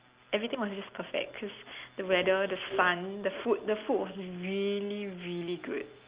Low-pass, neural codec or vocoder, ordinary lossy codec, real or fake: 3.6 kHz; none; Opus, 32 kbps; real